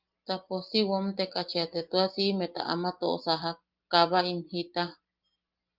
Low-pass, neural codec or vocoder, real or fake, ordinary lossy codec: 5.4 kHz; none; real; Opus, 32 kbps